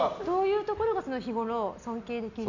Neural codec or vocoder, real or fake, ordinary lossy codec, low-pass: none; real; none; 7.2 kHz